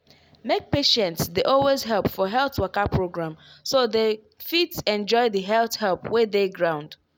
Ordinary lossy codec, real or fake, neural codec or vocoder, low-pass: none; real; none; none